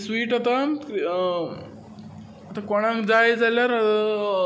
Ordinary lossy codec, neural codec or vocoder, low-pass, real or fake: none; none; none; real